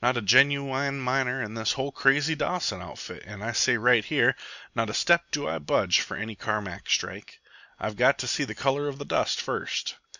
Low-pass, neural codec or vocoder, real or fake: 7.2 kHz; none; real